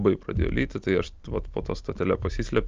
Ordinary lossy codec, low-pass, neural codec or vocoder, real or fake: Opus, 24 kbps; 7.2 kHz; none; real